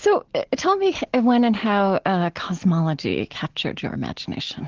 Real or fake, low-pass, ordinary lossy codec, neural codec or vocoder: real; 7.2 kHz; Opus, 16 kbps; none